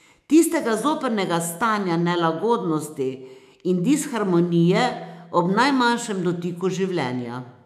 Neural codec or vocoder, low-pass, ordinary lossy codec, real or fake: autoencoder, 48 kHz, 128 numbers a frame, DAC-VAE, trained on Japanese speech; 14.4 kHz; none; fake